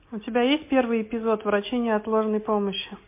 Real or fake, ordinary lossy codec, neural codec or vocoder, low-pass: real; MP3, 24 kbps; none; 3.6 kHz